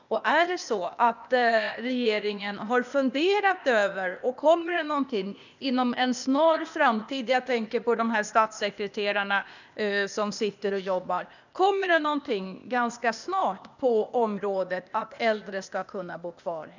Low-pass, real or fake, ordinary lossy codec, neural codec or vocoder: 7.2 kHz; fake; none; codec, 16 kHz, 0.8 kbps, ZipCodec